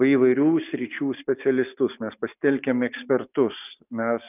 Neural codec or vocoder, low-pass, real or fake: none; 3.6 kHz; real